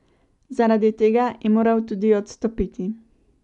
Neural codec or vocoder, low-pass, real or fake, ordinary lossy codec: none; 10.8 kHz; real; none